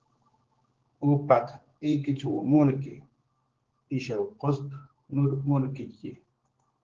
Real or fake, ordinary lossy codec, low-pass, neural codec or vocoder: fake; Opus, 16 kbps; 7.2 kHz; codec, 16 kHz, 4 kbps, X-Codec, HuBERT features, trained on general audio